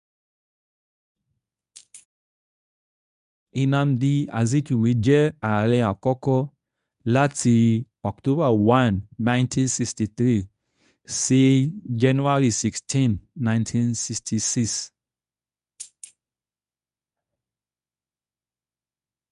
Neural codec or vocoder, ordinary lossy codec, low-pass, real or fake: codec, 24 kHz, 0.9 kbps, WavTokenizer, medium speech release version 1; none; 10.8 kHz; fake